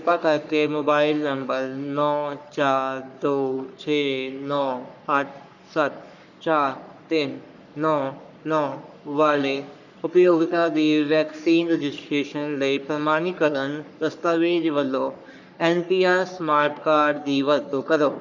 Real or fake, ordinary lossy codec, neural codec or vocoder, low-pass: fake; none; codec, 44.1 kHz, 3.4 kbps, Pupu-Codec; 7.2 kHz